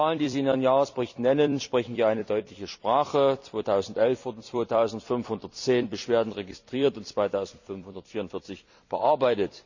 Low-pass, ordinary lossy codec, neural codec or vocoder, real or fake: 7.2 kHz; none; vocoder, 44.1 kHz, 128 mel bands every 256 samples, BigVGAN v2; fake